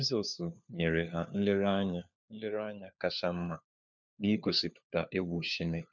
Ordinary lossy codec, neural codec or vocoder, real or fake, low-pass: none; codec, 16 kHz, 4 kbps, FunCodec, trained on LibriTTS, 50 frames a second; fake; 7.2 kHz